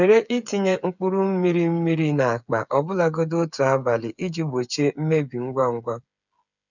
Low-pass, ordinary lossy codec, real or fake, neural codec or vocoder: 7.2 kHz; none; fake; codec, 16 kHz, 8 kbps, FreqCodec, smaller model